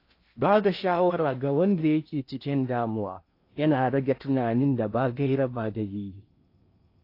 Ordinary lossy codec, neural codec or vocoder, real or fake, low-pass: AAC, 32 kbps; codec, 16 kHz in and 24 kHz out, 0.8 kbps, FocalCodec, streaming, 65536 codes; fake; 5.4 kHz